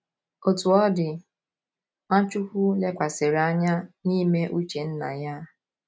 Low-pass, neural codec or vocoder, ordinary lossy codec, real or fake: none; none; none; real